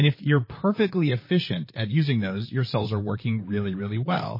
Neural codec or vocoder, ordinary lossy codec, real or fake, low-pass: vocoder, 44.1 kHz, 128 mel bands, Pupu-Vocoder; MP3, 24 kbps; fake; 5.4 kHz